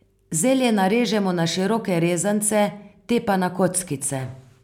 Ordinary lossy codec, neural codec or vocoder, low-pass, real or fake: none; none; 19.8 kHz; real